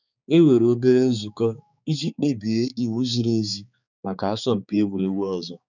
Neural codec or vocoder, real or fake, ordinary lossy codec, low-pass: codec, 16 kHz, 4 kbps, X-Codec, HuBERT features, trained on balanced general audio; fake; none; 7.2 kHz